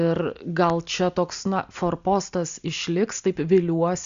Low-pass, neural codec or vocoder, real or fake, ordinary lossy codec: 7.2 kHz; none; real; Opus, 64 kbps